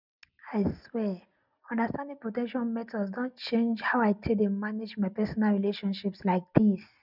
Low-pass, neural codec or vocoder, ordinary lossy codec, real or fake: 5.4 kHz; none; none; real